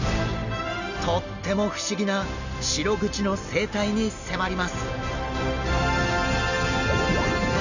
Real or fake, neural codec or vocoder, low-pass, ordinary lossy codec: real; none; 7.2 kHz; none